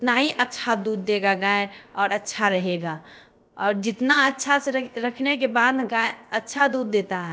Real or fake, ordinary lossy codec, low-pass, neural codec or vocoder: fake; none; none; codec, 16 kHz, about 1 kbps, DyCAST, with the encoder's durations